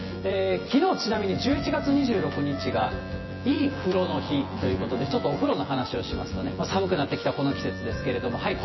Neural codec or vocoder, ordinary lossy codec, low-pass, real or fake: vocoder, 24 kHz, 100 mel bands, Vocos; MP3, 24 kbps; 7.2 kHz; fake